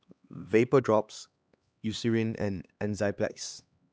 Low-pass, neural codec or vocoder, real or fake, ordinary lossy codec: none; codec, 16 kHz, 2 kbps, X-Codec, HuBERT features, trained on LibriSpeech; fake; none